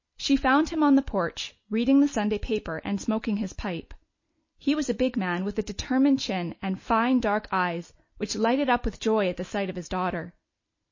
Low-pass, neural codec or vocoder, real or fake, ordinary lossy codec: 7.2 kHz; none; real; MP3, 32 kbps